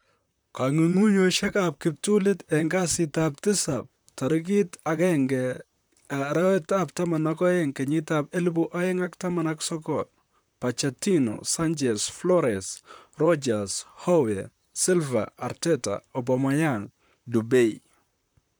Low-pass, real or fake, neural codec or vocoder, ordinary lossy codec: none; fake; vocoder, 44.1 kHz, 128 mel bands, Pupu-Vocoder; none